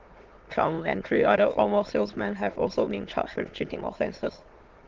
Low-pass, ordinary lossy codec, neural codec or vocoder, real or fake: 7.2 kHz; Opus, 16 kbps; autoencoder, 22.05 kHz, a latent of 192 numbers a frame, VITS, trained on many speakers; fake